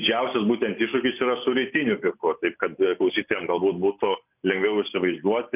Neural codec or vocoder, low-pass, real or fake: none; 3.6 kHz; real